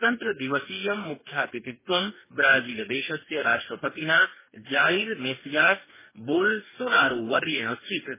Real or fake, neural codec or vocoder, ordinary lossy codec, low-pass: fake; codec, 44.1 kHz, 2.6 kbps, DAC; MP3, 16 kbps; 3.6 kHz